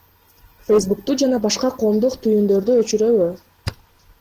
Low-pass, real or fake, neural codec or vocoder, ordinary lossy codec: 14.4 kHz; real; none; Opus, 16 kbps